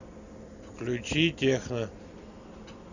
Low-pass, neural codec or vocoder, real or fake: 7.2 kHz; none; real